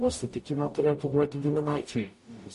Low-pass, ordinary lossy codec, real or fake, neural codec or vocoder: 14.4 kHz; MP3, 48 kbps; fake; codec, 44.1 kHz, 0.9 kbps, DAC